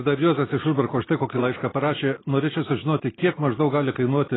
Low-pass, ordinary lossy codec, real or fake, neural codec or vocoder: 7.2 kHz; AAC, 16 kbps; real; none